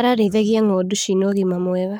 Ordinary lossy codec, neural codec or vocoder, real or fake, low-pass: none; codec, 44.1 kHz, 7.8 kbps, Pupu-Codec; fake; none